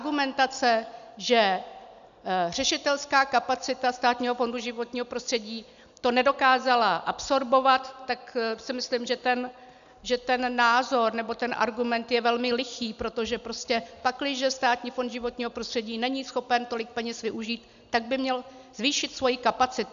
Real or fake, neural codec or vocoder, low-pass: real; none; 7.2 kHz